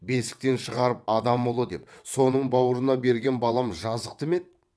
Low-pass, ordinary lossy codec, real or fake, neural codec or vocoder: none; none; fake; vocoder, 22.05 kHz, 80 mel bands, WaveNeXt